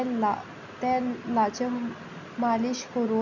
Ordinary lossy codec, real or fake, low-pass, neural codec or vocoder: none; real; 7.2 kHz; none